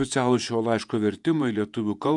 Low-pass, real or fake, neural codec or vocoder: 10.8 kHz; fake; vocoder, 48 kHz, 128 mel bands, Vocos